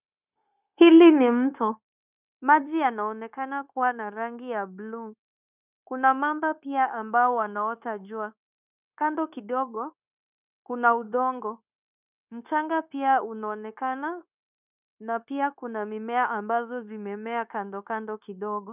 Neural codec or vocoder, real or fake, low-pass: codec, 16 kHz, 0.9 kbps, LongCat-Audio-Codec; fake; 3.6 kHz